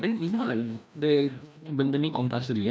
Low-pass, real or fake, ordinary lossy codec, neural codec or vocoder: none; fake; none; codec, 16 kHz, 1 kbps, FreqCodec, larger model